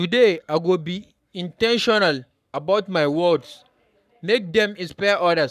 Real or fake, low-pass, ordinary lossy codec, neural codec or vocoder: fake; 14.4 kHz; none; codec, 44.1 kHz, 7.8 kbps, Pupu-Codec